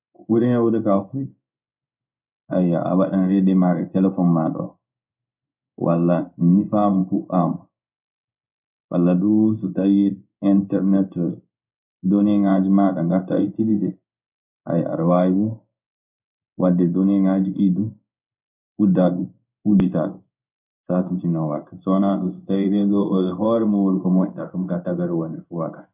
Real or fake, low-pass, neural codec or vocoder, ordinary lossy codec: fake; 3.6 kHz; codec, 16 kHz in and 24 kHz out, 1 kbps, XY-Tokenizer; none